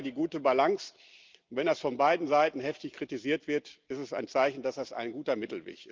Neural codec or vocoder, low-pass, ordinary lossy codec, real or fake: none; 7.2 kHz; Opus, 32 kbps; real